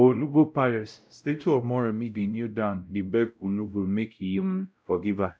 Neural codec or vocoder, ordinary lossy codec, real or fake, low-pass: codec, 16 kHz, 0.5 kbps, X-Codec, WavLM features, trained on Multilingual LibriSpeech; none; fake; none